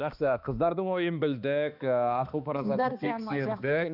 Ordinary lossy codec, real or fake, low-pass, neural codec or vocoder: none; fake; 5.4 kHz; codec, 16 kHz, 2 kbps, X-Codec, HuBERT features, trained on balanced general audio